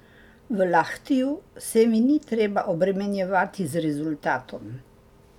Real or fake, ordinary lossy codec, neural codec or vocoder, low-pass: real; none; none; 19.8 kHz